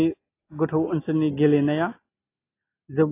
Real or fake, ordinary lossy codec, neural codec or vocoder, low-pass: real; AAC, 24 kbps; none; 3.6 kHz